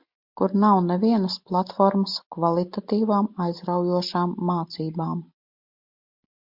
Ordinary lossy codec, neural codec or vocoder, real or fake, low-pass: MP3, 48 kbps; none; real; 5.4 kHz